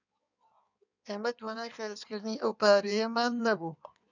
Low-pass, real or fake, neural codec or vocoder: 7.2 kHz; fake; codec, 16 kHz in and 24 kHz out, 1.1 kbps, FireRedTTS-2 codec